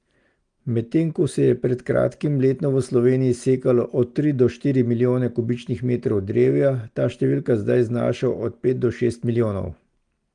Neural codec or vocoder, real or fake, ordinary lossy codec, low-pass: none; real; Opus, 24 kbps; 9.9 kHz